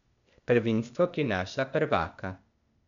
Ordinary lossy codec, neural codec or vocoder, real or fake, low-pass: none; codec, 16 kHz, 0.8 kbps, ZipCodec; fake; 7.2 kHz